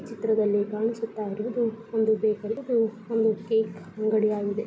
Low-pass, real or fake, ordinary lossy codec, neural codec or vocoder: none; real; none; none